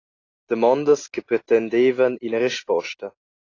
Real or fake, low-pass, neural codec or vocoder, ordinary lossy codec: real; 7.2 kHz; none; AAC, 32 kbps